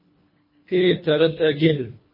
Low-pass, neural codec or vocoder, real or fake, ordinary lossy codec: 5.4 kHz; codec, 24 kHz, 1.5 kbps, HILCodec; fake; MP3, 24 kbps